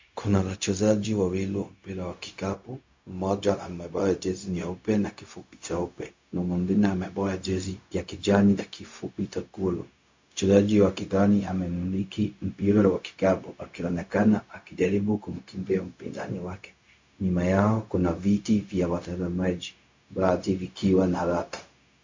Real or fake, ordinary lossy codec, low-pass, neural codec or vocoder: fake; MP3, 48 kbps; 7.2 kHz; codec, 16 kHz, 0.4 kbps, LongCat-Audio-Codec